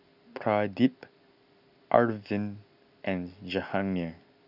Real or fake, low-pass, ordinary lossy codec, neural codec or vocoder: real; 5.4 kHz; none; none